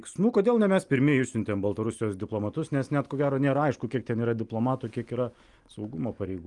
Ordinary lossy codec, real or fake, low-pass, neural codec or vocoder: Opus, 32 kbps; real; 10.8 kHz; none